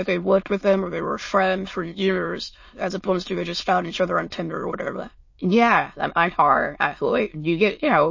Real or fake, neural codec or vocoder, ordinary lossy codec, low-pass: fake; autoencoder, 22.05 kHz, a latent of 192 numbers a frame, VITS, trained on many speakers; MP3, 32 kbps; 7.2 kHz